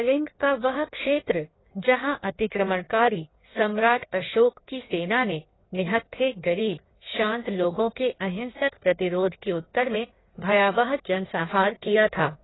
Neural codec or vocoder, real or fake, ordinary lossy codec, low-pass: codec, 16 kHz in and 24 kHz out, 1.1 kbps, FireRedTTS-2 codec; fake; AAC, 16 kbps; 7.2 kHz